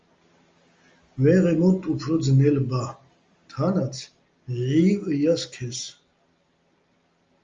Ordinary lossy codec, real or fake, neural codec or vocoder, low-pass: Opus, 32 kbps; real; none; 7.2 kHz